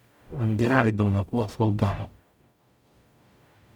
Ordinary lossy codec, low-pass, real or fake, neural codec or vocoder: none; 19.8 kHz; fake; codec, 44.1 kHz, 0.9 kbps, DAC